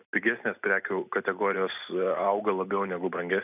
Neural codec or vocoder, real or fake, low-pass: none; real; 3.6 kHz